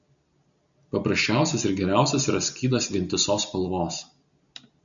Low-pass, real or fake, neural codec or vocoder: 7.2 kHz; real; none